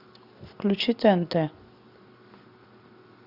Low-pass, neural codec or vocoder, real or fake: 5.4 kHz; none; real